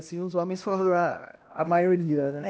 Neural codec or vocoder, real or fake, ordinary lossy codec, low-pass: codec, 16 kHz, 1 kbps, X-Codec, HuBERT features, trained on LibriSpeech; fake; none; none